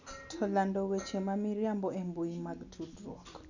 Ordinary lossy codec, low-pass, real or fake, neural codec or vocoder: none; 7.2 kHz; real; none